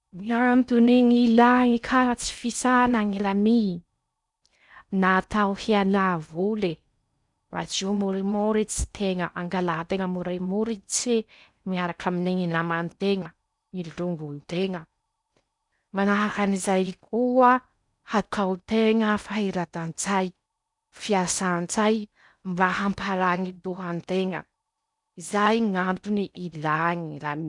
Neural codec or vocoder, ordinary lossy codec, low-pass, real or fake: codec, 16 kHz in and 24 kHz out, 0.6 kbps, FocalCodec, streaming, 4096 codes; none; 10.8 kHz; fake